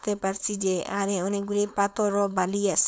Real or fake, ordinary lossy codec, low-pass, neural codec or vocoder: fake; none; none; codec, 16 kHz, 4.8 kbps, FACodec